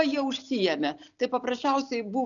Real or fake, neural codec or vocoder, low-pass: real; none; 7.2 kHz